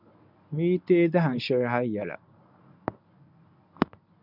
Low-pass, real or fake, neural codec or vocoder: 5.4 kHz; fake; codec, 24 kHz, 0.9 kbps, WavTokenizer, medium speech release version 1